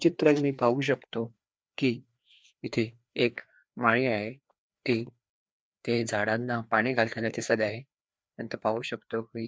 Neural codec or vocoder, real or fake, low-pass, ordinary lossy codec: codec, 16 kHz, 2 kbps, FreqCodec, larger model; fake; none; none